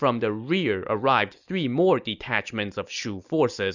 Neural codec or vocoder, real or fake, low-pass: none; real; 7.2 kHz